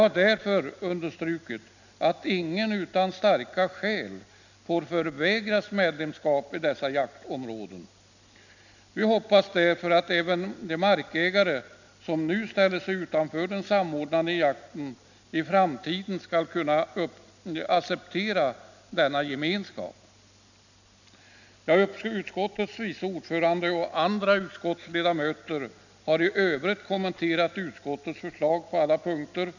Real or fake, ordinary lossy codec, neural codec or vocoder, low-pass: real; none; none; 7.2 kHz